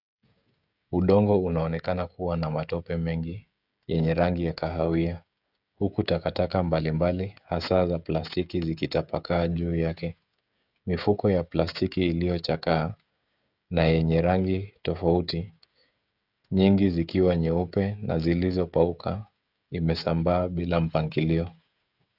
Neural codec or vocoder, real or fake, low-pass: codec, 16 kHz, 16 kbps, FreqCodec, smaller model; fake; 5.4 kHz